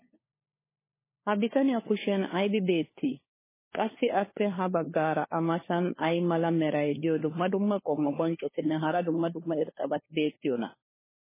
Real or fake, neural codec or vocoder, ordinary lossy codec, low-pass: fake; codec, 16 kHz, 4 kbps, FunCodec, trained on LibriTTS, 50 frames a second; MP3, 16 kbps; 3.6 kHz